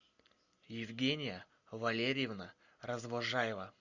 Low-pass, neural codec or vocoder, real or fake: 7.2 kHz; none; real